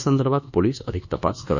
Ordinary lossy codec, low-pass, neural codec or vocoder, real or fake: none; 7.2 kHz; codec, 24 kHz, 1.2 kbps, DualCodec; fake